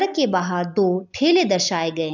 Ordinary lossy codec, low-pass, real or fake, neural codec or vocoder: none; 7.2 kHz; real; none